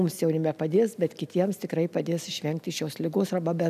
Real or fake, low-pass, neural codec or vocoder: fake; 14.4 kHz; vocoder, 44.1 kHz, 128 mel bands every 256 samples, BigVGAN v2